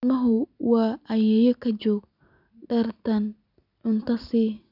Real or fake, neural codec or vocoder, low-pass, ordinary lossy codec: real; none; 5.4 kHz; none